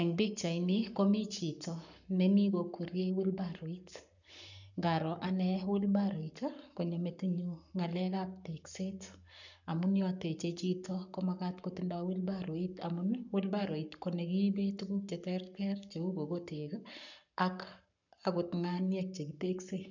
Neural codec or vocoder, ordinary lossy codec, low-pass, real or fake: codec, 44.1 kHz, 7.8 kbps, Pupu-Codec; none; 7.2 kHz; fake